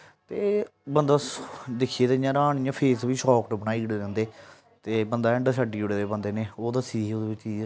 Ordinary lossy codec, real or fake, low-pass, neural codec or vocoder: none; real; none; none